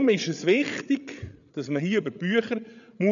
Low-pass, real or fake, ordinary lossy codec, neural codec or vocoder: 7.2 kHz; fake; none; codec, 16 kHz, 8 kbps, FreqCodec, larger model